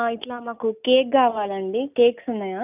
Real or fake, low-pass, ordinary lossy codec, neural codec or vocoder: real; 3.6 kHz; none; none